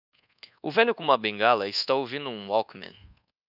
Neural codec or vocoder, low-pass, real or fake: codec, 24 kHz, 1.2 kbps, DualCodec; 5.4 kHz; fake